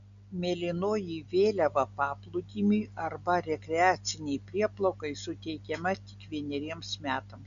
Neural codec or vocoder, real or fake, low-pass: none; real; 7.2 kHz